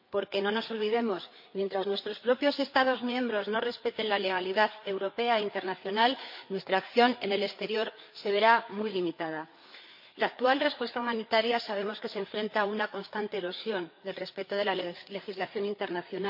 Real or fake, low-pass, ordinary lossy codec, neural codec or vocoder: fake; 5.4 kHz; MP3, 32 kbps; codec, 16 kHz, 4 kbps, FreqCodec, larger model